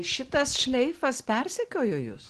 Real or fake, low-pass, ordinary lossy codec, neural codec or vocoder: real; 10.8 kHz; Opus, 16 kbps; none